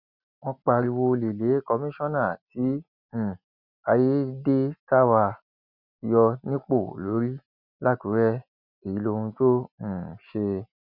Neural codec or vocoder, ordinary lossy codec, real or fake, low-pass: none; none; real; 5.4 kHz